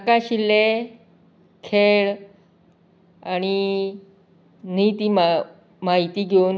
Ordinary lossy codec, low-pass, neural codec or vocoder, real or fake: none; none; none; real